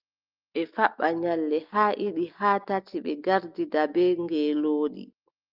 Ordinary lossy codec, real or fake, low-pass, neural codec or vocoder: Opus, 32 kbps; real; 5.4 kHz; none